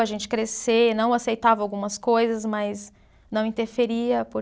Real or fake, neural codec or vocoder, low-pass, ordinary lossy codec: real; none; none; none